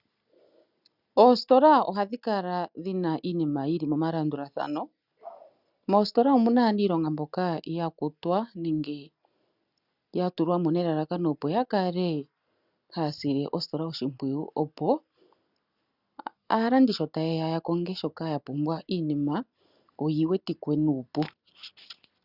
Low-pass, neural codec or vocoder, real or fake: 5.4 kHz; none; real